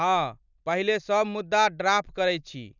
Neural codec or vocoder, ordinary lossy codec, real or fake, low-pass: none; none; real; 7.2 kHz